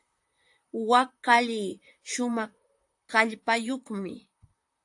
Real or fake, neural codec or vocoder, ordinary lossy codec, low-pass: fake; vocoder, 44.1 kHz, 128 mel bands, Pupu-Vocoder; AAC, 64 kbps; 10.8 kHz